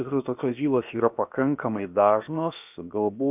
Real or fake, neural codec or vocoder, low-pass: fake; codec, 16 kHz, about 1 kbps, DyCAST, with the encoder's durations; 3.6 kHz